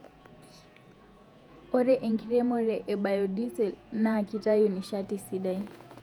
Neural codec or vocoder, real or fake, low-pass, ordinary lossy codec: vocoder, 48 kHz, 128 mel bands, Vocos; fake; 19.8 kHz; none